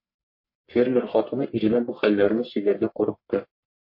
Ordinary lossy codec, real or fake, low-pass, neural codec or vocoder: MP3, 32 kbps; fake; 5.4 kHz; codec, 44.1 kHz, 1.7 kbps, Pupu-Codec